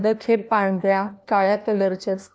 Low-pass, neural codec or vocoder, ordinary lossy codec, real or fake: none; codec, 16 kHz, 1 kbps, FunCodec, trained on LibriTTS, 50 frames a second; none; fake